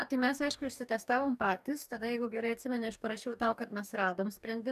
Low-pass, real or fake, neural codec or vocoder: 14.4 kHz; fake; codec, 44.1 kHz, 2.6 kbps, DAC